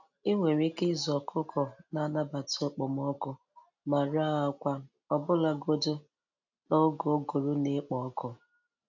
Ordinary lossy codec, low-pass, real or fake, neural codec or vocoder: none; 7.2 kHz; real; none